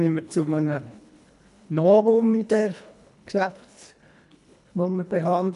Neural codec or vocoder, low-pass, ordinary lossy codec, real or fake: codec, 24 kHz, 1.5 kbps, HILCodec; 10.8 kHz; none; fake